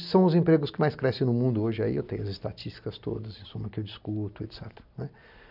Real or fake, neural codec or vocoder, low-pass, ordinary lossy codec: real; none; 5.4 kHz; none